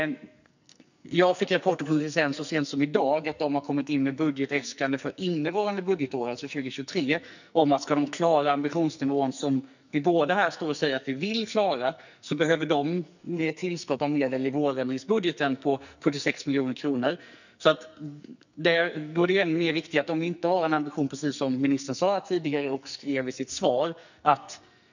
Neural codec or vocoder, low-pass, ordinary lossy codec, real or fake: codec, 44.1 kHz, 2.6 kbps, SNAC; 7.2 kHz; none; fake